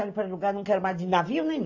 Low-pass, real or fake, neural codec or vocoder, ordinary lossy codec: 7.2 kHz; real; none; AAC, 48 kbps